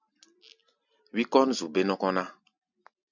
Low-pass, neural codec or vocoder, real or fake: 7.2 kHz; none; real